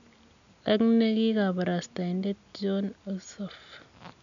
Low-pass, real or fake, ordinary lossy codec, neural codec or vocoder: 7.2 kHz; real; none; none